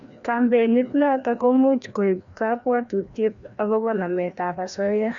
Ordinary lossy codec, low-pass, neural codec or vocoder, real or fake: Opus, 64 kbps; 7.2 kHz; codec, 16 kHz, 1 kbps, FreqCodec, larger model; fake